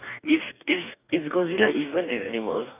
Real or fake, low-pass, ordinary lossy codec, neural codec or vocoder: fake; 3.6 kHz; none; codec, 44.1 kHz, 2.6 kbps, DAC